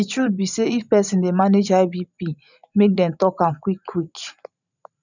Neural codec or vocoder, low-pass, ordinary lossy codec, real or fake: vocoder, 44.1 kHz, 128 mel bands every 512 samples, BigVGAN v2; 7.2 kHz; none; fake